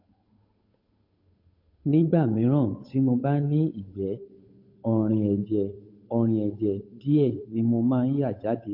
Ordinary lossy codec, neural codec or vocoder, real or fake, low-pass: MP3, 32 kbps; codec, 16 kHz, 8 kbps, FunCodec, trained on Chinese and English, 25 frames a second; fake; 5.4 kHz